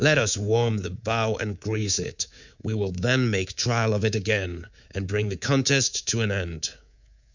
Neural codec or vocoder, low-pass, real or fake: codec, 24 kHz, 3.1 kbps, DualCodec; 7.2 kHz; fake